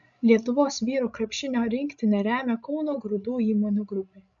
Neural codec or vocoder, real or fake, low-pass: codec, 16 kHz, 16 kbps, FreqCodec, larger model; fake; 7.2 kHz